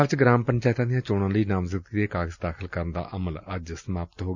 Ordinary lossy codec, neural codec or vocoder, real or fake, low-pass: none; none; real; 7.2 kHz